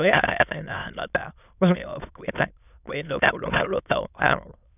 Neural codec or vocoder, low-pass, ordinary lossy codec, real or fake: autoencoder, 22.05 kHz, a latent of 192 numbers a frame, VITS, trained on many speakers; 3.6 kHz; none; fake